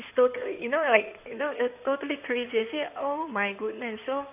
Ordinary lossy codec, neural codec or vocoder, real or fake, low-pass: none; codec, 16 kHz in and 24 kHz out, 2.2 kbps, FireRedTTS-2 codec; fake; 3.6 kHz